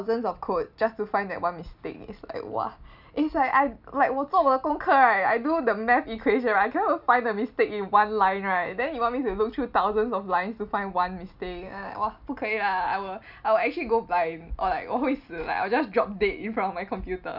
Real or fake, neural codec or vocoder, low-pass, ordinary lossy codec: real; none; 5.4 kHz; none